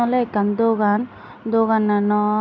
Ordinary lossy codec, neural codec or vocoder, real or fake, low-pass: none; none; real; 7.2 kHz